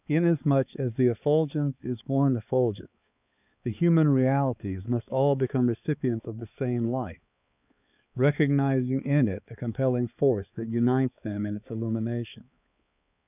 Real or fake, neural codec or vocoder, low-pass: fake; codec, 16 kHz, 4 kbps, X-Codec, HuBERT features, trained on balanced general audio; 3.6 kHz